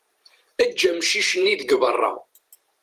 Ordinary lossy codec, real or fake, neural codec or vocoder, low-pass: Opus, 24 kbps; fake; vocoder, 44.1 kHz, 128 mel bands every 512 samples, BigVGAN v2; 14.4 kHz